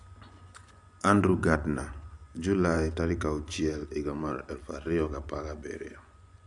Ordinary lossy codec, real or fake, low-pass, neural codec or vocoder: none; fake; 10.8 kHz; vocoder, 24 kHz, 100 mel bands, Vocos